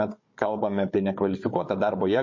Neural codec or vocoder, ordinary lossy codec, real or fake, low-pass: codec, 16 kHz, 16 kbps, FunCodec, trained on Chinese and English, 50 frames a second; MP3, 32 kbps; fake; 7.2 kHz